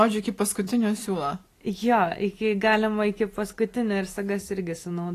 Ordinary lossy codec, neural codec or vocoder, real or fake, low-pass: AAC, 48 kbps; none; real; 14.4 kHz